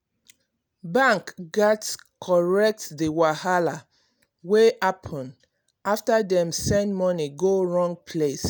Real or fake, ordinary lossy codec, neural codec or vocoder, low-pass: real; none; none; none